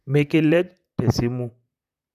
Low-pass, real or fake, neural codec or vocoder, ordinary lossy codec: 14.4 kHz; fake; vocoder, 44.1 kHz, 128 mel bands, Pupu-Vocoder; none